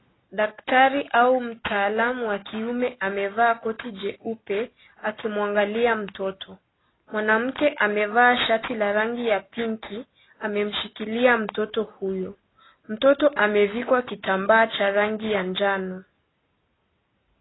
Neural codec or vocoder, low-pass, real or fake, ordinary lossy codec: none; 7.2 kHz; real; AAC, 16 kbps